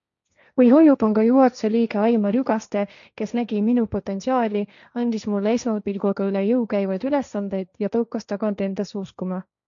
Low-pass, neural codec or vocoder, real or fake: 7.2 kHz; codec, 16 kHz, 1.1 kbps, Voila-Tokenizer; fake